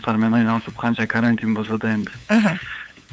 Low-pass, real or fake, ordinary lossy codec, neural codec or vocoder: none; fake; none; codec, 16 kHz, 8 kbps, FunCodec, trained on LibriTTS, 25 frames a second